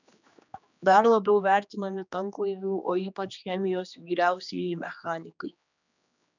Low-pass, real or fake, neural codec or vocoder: 7.2 kHz; fake; codec, 16 kHz, 2 kbps, X-Codec, HuBERT features, trained on general audio